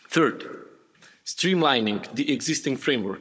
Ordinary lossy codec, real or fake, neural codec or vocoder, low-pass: none; fake; codec, 16 kHz, 16 kbps, FunCodec, trained on Chinese and English, 50 frames a second; none